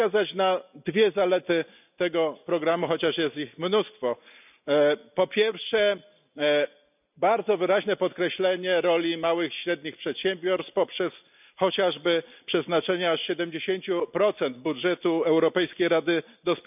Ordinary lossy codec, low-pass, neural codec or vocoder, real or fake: none; 3.6 kHz; none; real